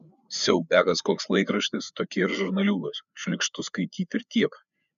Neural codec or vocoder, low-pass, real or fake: codec, 16 kHz, 4 kbps, FreqCodec, larger model; 7.2 kHz; fake